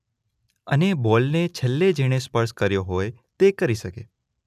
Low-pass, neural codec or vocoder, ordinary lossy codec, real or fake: 14.4 kHz; none; none; real